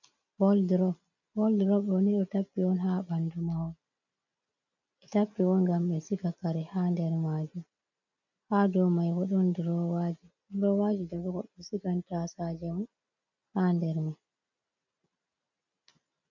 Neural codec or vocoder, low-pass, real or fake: none; 7.2 kHz; real